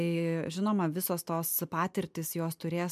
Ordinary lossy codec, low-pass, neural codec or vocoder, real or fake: MP3, 96 kbps; 14.4 kHz; none; real